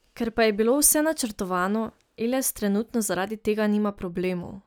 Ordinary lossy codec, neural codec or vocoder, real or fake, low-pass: none; none; real; none